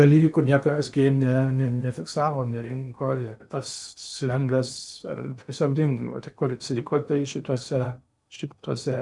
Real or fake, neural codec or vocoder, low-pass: fake; codec, 16 kHz in and 24 kHz out, 0.8 kbps, FocalCodec, streaming, 65536 codes; 10.8 kHz